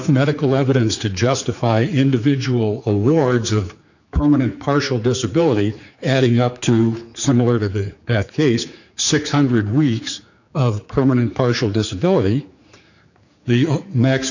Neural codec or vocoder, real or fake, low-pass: codec, 16 kHz, 4 kbps, X-Codec, HuBERT features, trained on general audio; fake; 7.2 kHz